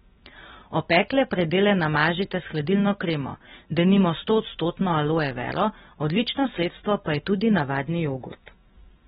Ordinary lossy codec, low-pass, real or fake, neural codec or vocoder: AAC, 16 kbps; 19.8 kHz; real; none